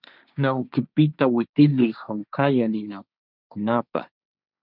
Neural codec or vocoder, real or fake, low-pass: codec, 16 kHz, 1.1 kbps, Voila-Tokenizer; fake; 5.4 kHz